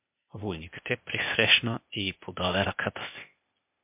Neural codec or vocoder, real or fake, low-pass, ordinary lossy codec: codec, 16 kHz, 0.8 kbps, ZipCodec; fake; 3.6 kHz; MP3, 32 kbps